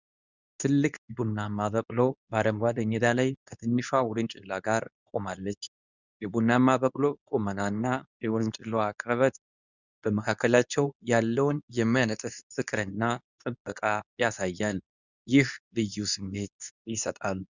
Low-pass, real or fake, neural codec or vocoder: 7.2 kHz; fake; codec, 24 kHz, 0.9 kbps, WavTokenizer, medium speech release version 2